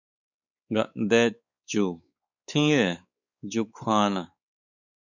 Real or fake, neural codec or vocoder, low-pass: fake; codec, 16 kHz, 4 kbps, X-Codec, WavLM features, trained on Multilingual LibriSpeech; 7.2 kHz